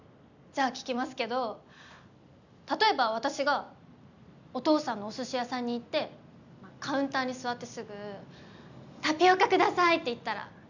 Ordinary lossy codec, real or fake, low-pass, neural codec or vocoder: none; real; 7.2 kHz; none